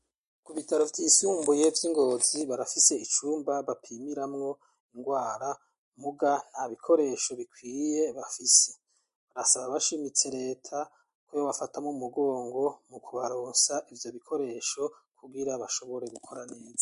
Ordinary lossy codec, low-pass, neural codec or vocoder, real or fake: MP3, 48 kbps; 10.8 kHz; none; real